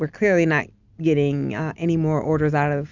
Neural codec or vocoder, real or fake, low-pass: none; real; 7.2 kHz